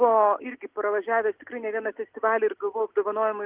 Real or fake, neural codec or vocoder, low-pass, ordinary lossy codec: real; none; 3.6 kHz; Opus, 16 kbps